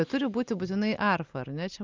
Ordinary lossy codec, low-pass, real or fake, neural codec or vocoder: Opus, 32 kbps; 7.2 kHz; real; none